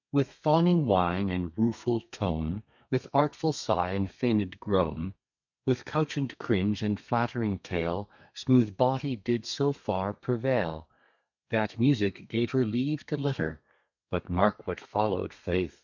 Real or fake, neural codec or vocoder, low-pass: fake; codec, 32 kHz, 1.9 kbps, SNAC; 7.2 kHz